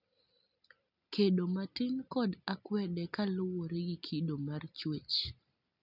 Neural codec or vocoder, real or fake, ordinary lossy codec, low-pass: none; real; none; 5.4 kHz